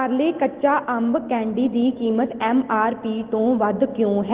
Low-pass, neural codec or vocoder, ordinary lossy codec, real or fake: 3.6 kHz; none; Opus, 16 kbps; real